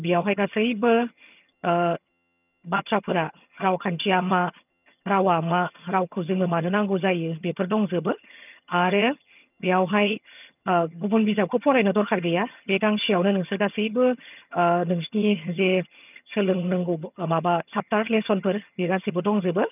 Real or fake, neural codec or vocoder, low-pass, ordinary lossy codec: fake; vocoder, 22.05 kHz, 80 mel bands, HiFi-GAN; 3.6 kHz; none